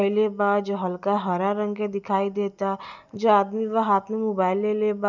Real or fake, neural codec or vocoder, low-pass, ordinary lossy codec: real; none; 7.2 kHz; none